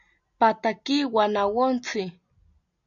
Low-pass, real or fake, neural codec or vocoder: 7.2 kHz; real; none